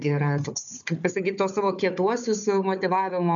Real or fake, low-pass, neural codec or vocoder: fake; 7.2 kHz; codec, 16 kHz, 4 kbps, FunCodec, trained on Chinese and English, 50 frames a second